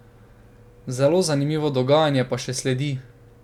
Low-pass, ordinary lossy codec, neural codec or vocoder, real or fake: 19.8 kHz; none; none; real